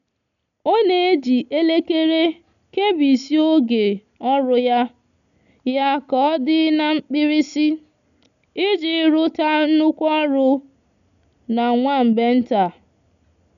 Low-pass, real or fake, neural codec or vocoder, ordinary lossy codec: 7.2 kHz; real; none; none